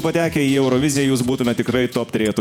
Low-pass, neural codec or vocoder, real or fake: 19.8 kHz; vocoder, 48 kHz, 128 mel bands, Vocos; fake